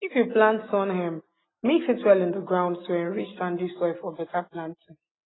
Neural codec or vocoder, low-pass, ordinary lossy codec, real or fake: none; 7.2 kHz; AAC, 16 kbps; real